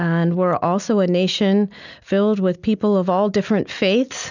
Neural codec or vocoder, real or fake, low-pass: none; real; 7.2 kHz